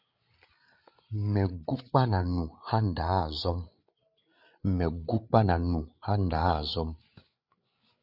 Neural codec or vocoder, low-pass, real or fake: codec, 16 kHz, 8 kbps, FreqCodec, larger model; 5.4 kHz; fake